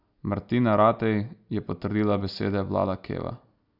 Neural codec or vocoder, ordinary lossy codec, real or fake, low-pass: none; none; real; 5.4 kHz